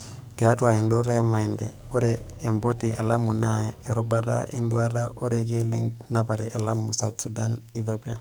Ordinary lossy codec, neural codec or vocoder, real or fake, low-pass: none; codec, 44.1 kHz, 2.6 kbps, SNAC; fake; none